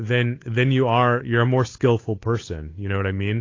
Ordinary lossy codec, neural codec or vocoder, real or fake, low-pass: AAC, 32 kbps; codec, 16 kHz, 8 kbps, FunCodec, trained on Chinese and English, 25 frames a second; fake; 7.2 kHz